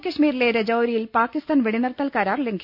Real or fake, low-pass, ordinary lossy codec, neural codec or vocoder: real; 5.4 kHz; none; none